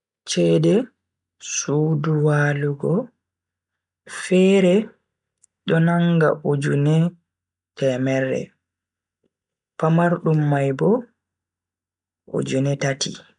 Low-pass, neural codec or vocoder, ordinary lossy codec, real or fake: 10.8 kHz; none; none; real